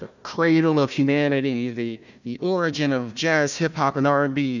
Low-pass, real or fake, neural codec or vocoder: 7.2 kHz; fake; codec, 16 kHz, 1 kbps, FunCodec, trained on Chinese and English, 50 frames a second